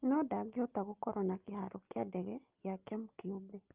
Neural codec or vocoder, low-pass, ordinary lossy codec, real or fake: none; 3.6 kHz; Opus, 32 kbps; real